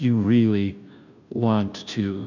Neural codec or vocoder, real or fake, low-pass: codec, 16 kHz, 0.5 kbps, FunCodec, trained on Chinese and English, 25 frames a second; fake; 7.2 kHz